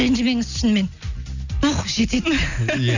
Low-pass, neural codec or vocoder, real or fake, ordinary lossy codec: 7.2 kHz; none; real; none